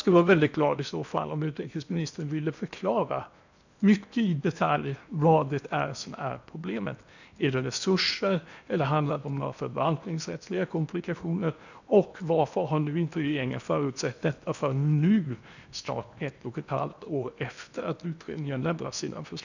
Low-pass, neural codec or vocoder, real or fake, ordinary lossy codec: 7.2 kHz; codec, 16 kHz in and 24 kHz out, 0.8 kbps, FocalCodec, streaming, 65536 codes; fake; none